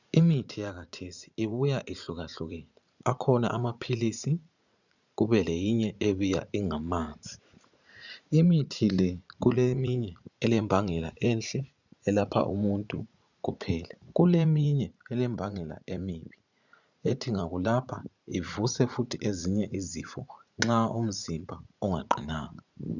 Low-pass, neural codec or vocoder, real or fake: 7.2 kHz; vocoder, 44.1 kHz, 80 mel bands, Vocos; fake